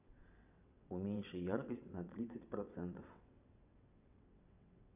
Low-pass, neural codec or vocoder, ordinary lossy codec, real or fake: 3.6 kHz; vocoder, 22.05 kHz, 80 mel bands, WaveNeXt; none; fake